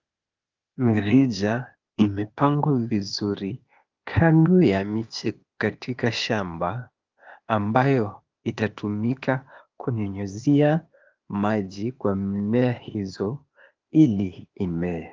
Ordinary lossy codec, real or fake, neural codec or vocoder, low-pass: Opus, 24 kbps; fake; codec, 16 kHz, 0.8 kbps, ZipCodec; 7.2 kHz